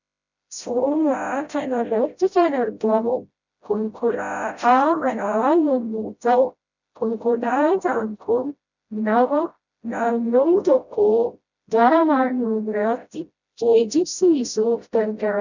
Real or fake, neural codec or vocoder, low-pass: fake; codec, 16 kHz, 0.5 kbps, FreqCodec, smaller model; 7.2 kHz